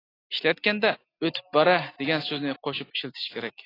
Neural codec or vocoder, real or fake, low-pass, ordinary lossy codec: none; real; 5.4 kHz; AAC, 24 kbps